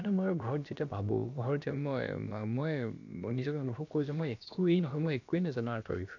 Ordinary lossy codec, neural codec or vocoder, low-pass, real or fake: none; codec, 16 kHz, about 1 kbps, DyCAST, with the encoder's durations; 7.2 kHz; fake